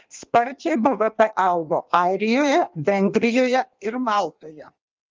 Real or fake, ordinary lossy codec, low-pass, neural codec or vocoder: fake; Opus, 32 kbps; 7.2 kHz; codec, 16 kHz in and 24 kHz out, 1.1 kbps, FireRedTTS-2 codec